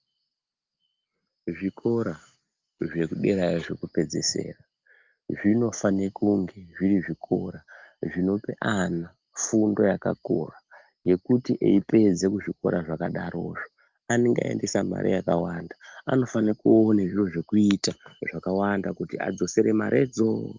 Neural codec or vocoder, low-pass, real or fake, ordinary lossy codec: none; 7.2 kHz; real; Opus, 32 kbps